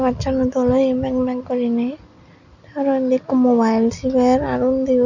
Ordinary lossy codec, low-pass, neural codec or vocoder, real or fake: none; 7.2 kHz; none; real